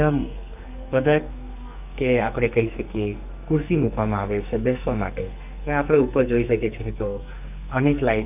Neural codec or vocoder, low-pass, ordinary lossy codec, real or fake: codec, 44.1 kHz, 2.6 kbps, SNAC; 3.6 kHz; none; fake